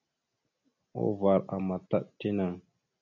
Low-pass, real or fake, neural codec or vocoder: 7.2 kHz; real; none